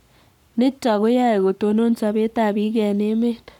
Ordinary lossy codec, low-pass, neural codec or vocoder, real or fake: none; 19.8 kHz; codec, 44.1 kHz, 7.8 kbps, Pupu-Codec; fake